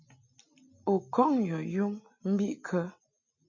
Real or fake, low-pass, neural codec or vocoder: real; 7.2 kHz; none